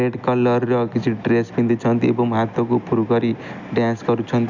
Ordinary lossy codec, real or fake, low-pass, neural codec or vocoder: none; real; 7.2 kHz; none